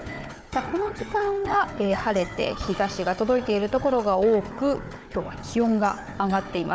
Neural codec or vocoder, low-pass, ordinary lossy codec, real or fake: codec, 16 kHz, 4 kbps, FunCodec, trained on Chinese and English, 50 frames a second; none; none; fake